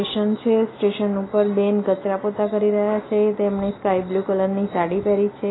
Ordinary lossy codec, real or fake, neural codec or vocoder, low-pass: AAC, 16 kbps; real; none; 7.2 kHz